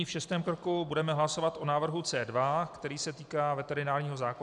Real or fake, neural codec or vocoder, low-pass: real; none; 10.8 kHz